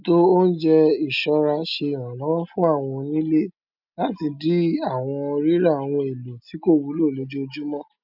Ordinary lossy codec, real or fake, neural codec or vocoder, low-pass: none; real; none; 5.4 kHz